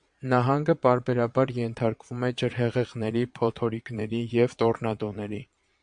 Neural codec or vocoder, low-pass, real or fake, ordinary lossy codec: vocoder, 22.05 kHz, 80 mel bands, Vocos; 9.9 kHz; fake; MP3, 64 kbps